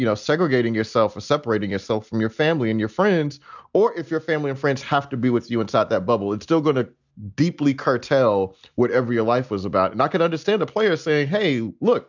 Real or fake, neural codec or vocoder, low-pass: real; none; 7.2 kHz